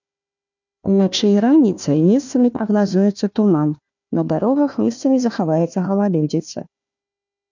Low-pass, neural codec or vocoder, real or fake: 7.2 kHz; codec, 16 kHz, 1 kbps, FunCodec, trained on Chinese and English, 50 frames a second; fake